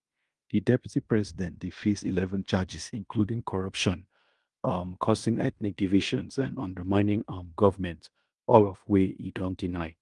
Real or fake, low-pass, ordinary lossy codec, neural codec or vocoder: fake; 10.8 kHz; Opus, 32 kbps; codec, 16 kHz in and 24 kHz out, 0.9 kbps, LongCat-Audio-Codec, fine tuned four codebook decoder